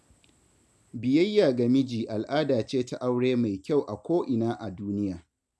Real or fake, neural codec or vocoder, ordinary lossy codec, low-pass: real; none; none; none